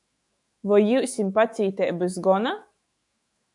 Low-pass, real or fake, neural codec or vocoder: 10.8 kHz; fake; autoencoder, 48 kHz, 128 numbers a frame, DAC-VAE, trained on Japanese speech